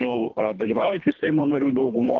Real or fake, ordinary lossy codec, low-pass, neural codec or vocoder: fake; Opus, 32 kbps; 7.2 kHz; codec, 24 kHz, 1.5 kbps, HILCodec